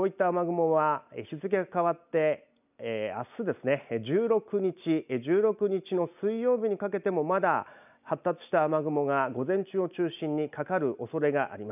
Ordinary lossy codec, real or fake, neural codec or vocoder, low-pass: none; real; none; 3.6 kHz